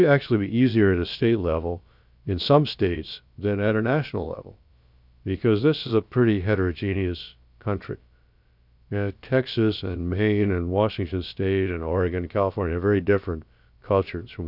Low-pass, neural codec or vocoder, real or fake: 5.4 kHz; codec, 16 kHz, about 1 kbps, DyCAST, with the encoder's durations; fake